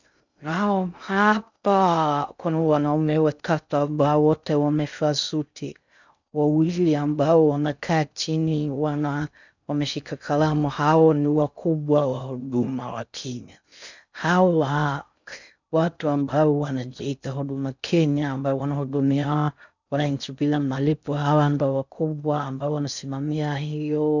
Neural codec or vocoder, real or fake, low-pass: codec, 16 kHz in and 24 kHz out, 0.6 kbps, FocalCodec, streaming, 2048 codes; fake; 7.2 kHz